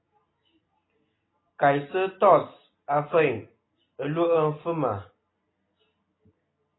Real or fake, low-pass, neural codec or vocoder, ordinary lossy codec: real; 7.2 kHz; none; AAC, 16 kbps